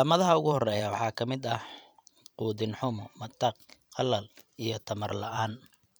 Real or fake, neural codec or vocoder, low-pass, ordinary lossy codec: fake; vocoder, 44.1 kHz, 128 mel bands every 512 samples, BigVGAN v2; none; none